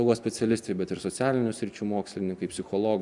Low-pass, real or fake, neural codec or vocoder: 10.8 kHz; real; none